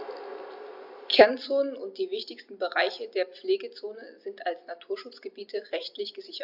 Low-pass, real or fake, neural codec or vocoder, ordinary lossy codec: 5.4 kHz; real; none; AAC, 48 kbps